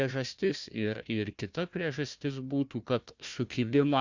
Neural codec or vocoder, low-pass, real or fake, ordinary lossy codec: codec, 16 kHz, 1 kbps, FunCodec, trained on Chinese and English, 50 frames a second; 7.2 kHz; fake; Opus, 64 kbps